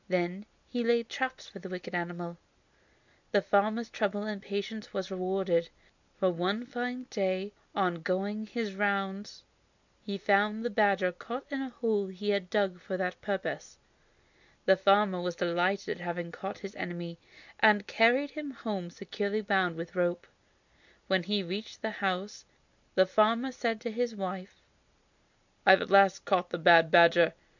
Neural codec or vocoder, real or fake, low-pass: none; real; 7.2 kHz